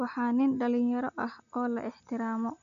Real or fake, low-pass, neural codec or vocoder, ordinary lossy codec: real; 7.2 kHz; none; none